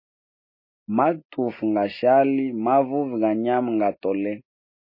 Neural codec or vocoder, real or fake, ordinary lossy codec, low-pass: none; real; MP3, 24 kbps; 5.4 kHz